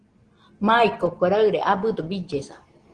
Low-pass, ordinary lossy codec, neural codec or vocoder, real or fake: 9.9 kHz; Opus, 16 kbps; none; real